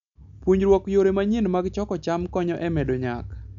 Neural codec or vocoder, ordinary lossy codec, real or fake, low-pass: none; none; real; 7.2 kHz